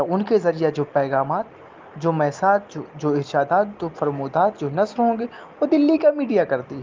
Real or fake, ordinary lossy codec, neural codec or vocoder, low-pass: real; Opus, 32 kbps; none; 7.2 kHz